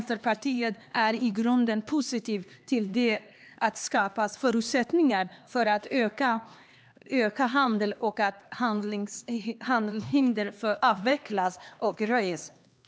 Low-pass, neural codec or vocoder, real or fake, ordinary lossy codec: none; codec, 16 kHz, 2 kbps, X-Codec, HuBERT features, trained on LibriSpeech; fake; none